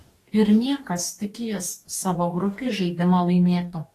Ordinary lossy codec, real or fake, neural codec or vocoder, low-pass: AAC, 48 kbps; fake; codec, 44.1 kHz, 2.6 kbps, DAC; 14.4 kHz